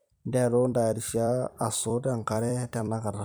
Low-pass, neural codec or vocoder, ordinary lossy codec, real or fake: none; vocoder, 44.1 kHz, 128 mel bands every 256 samples, BigVGAN v2; none; fake